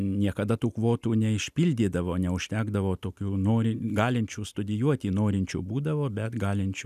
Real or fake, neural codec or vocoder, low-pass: real; none; 14.4 kHz